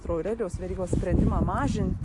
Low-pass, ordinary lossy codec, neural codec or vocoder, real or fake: 10.8 kHz; MP3, 64 kbps; none; real